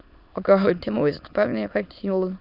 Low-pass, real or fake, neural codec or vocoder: 5.4 kHz; fake; autoencoder, 22.05 kHz, a latent of 192 numbers a frame, VITS, trained on many speakers